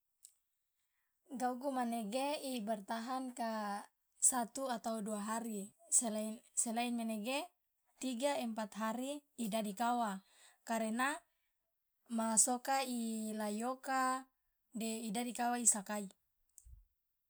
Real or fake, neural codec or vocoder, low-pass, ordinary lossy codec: real; none; none; none